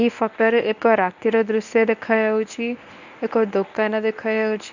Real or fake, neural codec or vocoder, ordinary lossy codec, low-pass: fake; codec, 24 kHz, 0.9 kbps, WavTokenizer, medium speech release version 1; none; 7.2 kHz